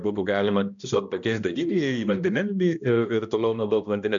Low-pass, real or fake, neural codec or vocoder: 7.2 kHz; fake; codec, 16 kHz, 1 kbps, X-Codec, HuBERT features, trained on balanced general audio